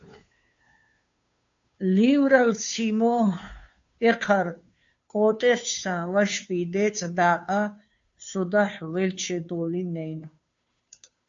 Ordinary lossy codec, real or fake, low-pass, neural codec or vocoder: AAC, 64 kbps; fake; 7.2 kHz; codec, 16 kHz, 2 kbps, FunCodec, trained on Chinese and English, 25 frames a second